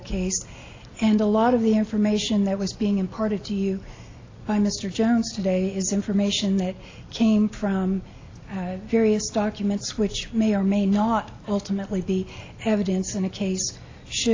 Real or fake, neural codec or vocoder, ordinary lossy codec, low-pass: real; none; AAC, 32 kbps; 7.2 kHz